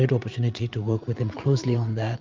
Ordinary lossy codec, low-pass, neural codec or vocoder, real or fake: Opus, 24 kbps; 7.2 kHz; vocoder, 44.1 kHz, 80 mel bands, Vocos; fake